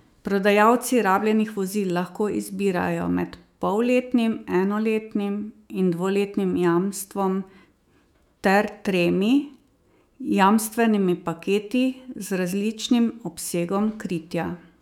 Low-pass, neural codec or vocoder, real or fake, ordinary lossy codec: 19.8 kHz; autoencoder, 48 kHz, 128 numbers a frame, DAC-VAE, trained on Japanese speech; fake; none